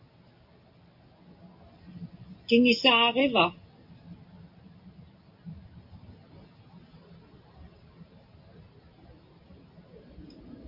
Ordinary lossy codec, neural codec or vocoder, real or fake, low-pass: AAC, 48 kbps; none; real; 5.4 kHz